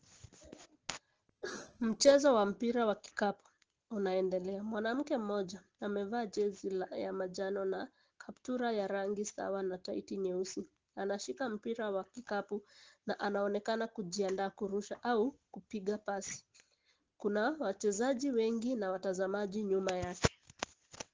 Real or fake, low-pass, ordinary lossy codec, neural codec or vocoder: real; 7.2 kHz; Opus, 16 kbps; none